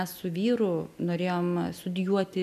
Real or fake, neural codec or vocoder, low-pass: real; none; 14.4 kHz